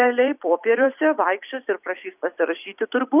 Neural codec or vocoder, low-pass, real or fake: vocoder, 44.1 kHz, 128 mel bands every 256 samples, BigVGAN v2; 3.6 kHz; fake